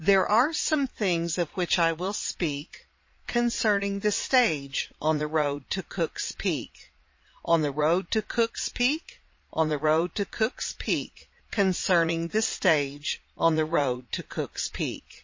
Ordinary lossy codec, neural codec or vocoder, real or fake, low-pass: MP3, 32 kbps; vocoder, 22.05 kHz, 80 mel bands, Vocos; fake; 7.2 kHz